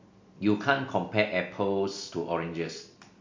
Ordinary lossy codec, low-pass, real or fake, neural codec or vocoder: MP3, 48 kbps; 7.2 kHz; real; none